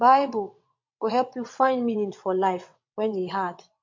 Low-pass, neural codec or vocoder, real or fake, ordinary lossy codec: 7.2 kHz; vocoder, 22.05 kHz, 80 mel bands, WaveNeXt; fake; MP3, 48 kbps